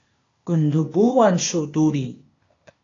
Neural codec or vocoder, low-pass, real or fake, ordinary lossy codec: codec, 16 kHz, 0.8 kbps, ZipCodec; 7.2 kHz; fake; AAC, 32 kbps